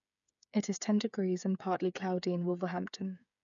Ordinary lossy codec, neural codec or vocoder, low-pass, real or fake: none; codec, 16 kHz, 8 kbps, FreqCodec, smaller model; 7.2 kHz; fake